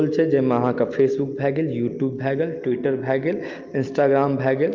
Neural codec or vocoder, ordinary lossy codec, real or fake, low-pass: none; Opus, 24 kbps; real; 7.2 kHz